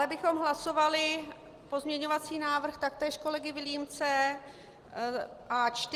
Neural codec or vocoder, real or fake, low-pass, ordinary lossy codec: none; real; 14.4 kHz; Opus, 24 kbps